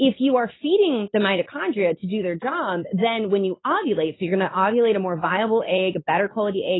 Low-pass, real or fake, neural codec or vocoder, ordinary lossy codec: 7.2 kHz; real; none; AAC, 16 kbps